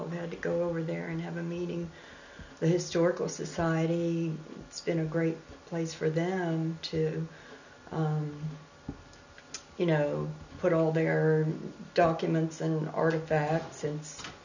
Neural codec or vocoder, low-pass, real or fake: none; 7.2 kHz; real